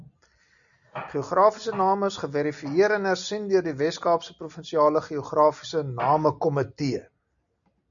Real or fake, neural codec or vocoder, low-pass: real; none; 7.2 kHz